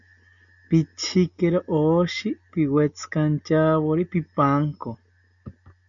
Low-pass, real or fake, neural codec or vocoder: 7.2 kHz; real; none